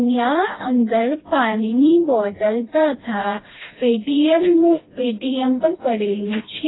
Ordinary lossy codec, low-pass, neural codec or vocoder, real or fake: AAC, 16 kbps; 7.2 kHz; codec, 16 kHz, 1 kbps, FreqCodec, smaller model; fake